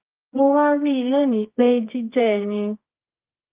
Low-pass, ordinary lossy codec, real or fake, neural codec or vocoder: 3.6 kHz; Opus, 32 kbps; fake; codec, 24 kHz, 0.9 kbps, WavTokenizer, medium music audio release